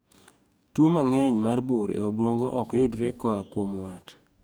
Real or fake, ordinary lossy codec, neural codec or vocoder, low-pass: fake; none; codec, 44.1 kHz, 2.6 kbps, DAC; none